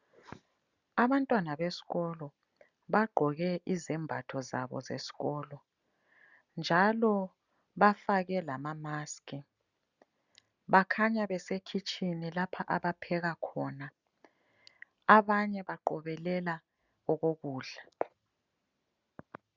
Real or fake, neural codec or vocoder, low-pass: real; none; 7.2 kHz